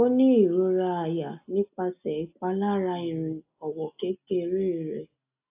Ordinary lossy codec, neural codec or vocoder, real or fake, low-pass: AAC, 32 kbps; none; real; 3.6 kHz